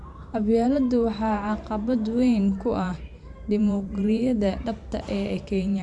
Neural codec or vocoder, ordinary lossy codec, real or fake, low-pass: vocoder, 44.1 kHz, 128 mel bands every 512 samples, BigVGAN v2; none; fake; 10.8 kHz